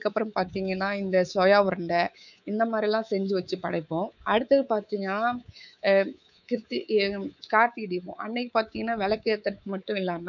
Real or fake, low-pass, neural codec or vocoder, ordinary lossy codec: fake; 7.2 kHz; codec, 24 kHz, 3.1 kbps, DualCodec; none